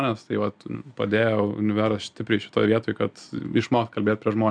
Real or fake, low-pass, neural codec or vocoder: real; 9.9 kHz; none